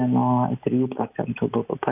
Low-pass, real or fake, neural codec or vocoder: 3.6 kHz; real; none